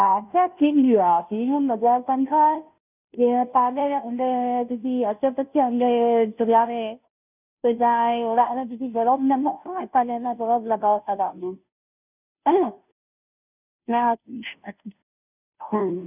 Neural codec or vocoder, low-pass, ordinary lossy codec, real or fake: codec, 16 kHz, 0.5 kbps, FunCodec, trained on Chinese and English, 25 frames a second; 3.6 kHz; none; fake